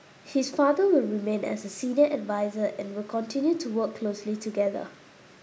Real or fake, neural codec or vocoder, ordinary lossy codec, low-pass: real; none; none; none